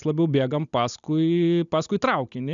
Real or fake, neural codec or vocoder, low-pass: real; none; 7.2 kHz